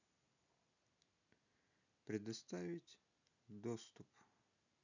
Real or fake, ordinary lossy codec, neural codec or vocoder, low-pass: real; none; none; 7.2 kHz